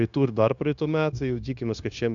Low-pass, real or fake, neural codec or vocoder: 7.2 kHz; fake; codec, 16 kHz, 0.9 kbps, LongCat-Audio-Codec